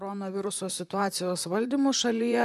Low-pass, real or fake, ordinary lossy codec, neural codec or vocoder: 14.4 kHz; fake; AAC, 96 kbps; vocoder, 44.1 kHz, 128 mel bands, Pupu-Vocoder